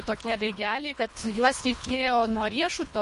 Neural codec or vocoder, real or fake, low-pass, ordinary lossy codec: codec, 24 kHz, 1.5 kbps, HILCodec; fake; 10.8 kHz; MP3, 48 kbps